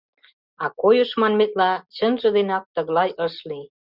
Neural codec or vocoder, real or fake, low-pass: none; real; 5.4 kHz